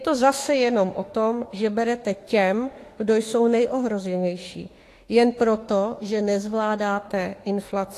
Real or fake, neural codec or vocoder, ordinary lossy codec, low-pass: fake; autoencoder, 48 kHz, 32 numbers a frame, DAC-VAE, trained on Japanese speech; AAC, 48 kbps; 14.4 kHz